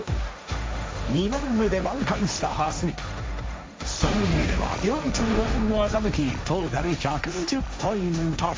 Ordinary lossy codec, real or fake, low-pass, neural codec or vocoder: none; fake; none; codec, 16 kHz, 1.1 kbps, Voila-Tokenizer